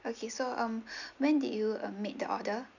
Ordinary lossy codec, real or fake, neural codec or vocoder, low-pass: none; real; none; 7.2 kHz